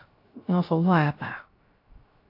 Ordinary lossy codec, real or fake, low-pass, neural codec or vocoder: AAC, 32 kbps; fake; 5.4 kHz; codec, 16 kHz, 0.3 kbps, FocalCodec